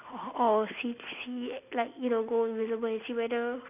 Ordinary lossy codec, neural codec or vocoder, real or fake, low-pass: none; none; real; 3.6 kHz